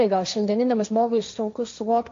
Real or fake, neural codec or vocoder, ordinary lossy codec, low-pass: fake; codec, 16 kHz, 1.1 kbps, Voila-Tokenizer; MP3, 48 kbps; 7.2 kHz